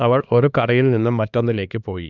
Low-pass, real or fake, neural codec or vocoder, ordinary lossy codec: 7.2 kHz; fake; codec, 16 kHz, 2 kbps, X-Codec, HuBERT features, trained on LibriSpeech; none